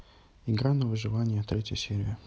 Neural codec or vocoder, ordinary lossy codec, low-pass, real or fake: none; none; none; real